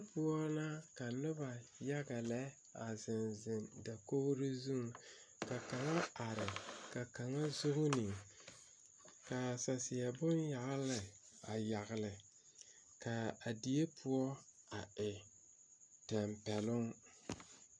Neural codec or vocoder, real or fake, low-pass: none; real; 9.9 kHz